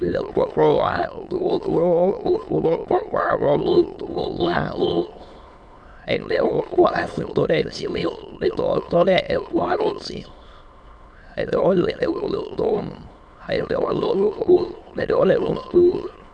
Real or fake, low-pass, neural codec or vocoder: fake; 9.9 kHz; autoencoder, 22.05 kHz, a latent of 192 numbers a frame, VITS, trained on many speakers